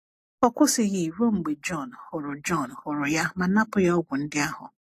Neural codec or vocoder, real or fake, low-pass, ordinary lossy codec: none; real; 14.4 kHz; AAC, 48 kbps